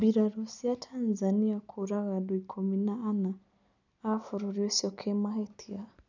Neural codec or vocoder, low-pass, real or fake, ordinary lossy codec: none; 7.2 kHz; real; none